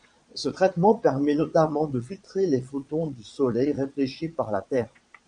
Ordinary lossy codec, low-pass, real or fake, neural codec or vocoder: MP3, 64 kbps; 9.9 kHz; fake; vocoder, 22.05 kHz, 80 mel bands, Vocos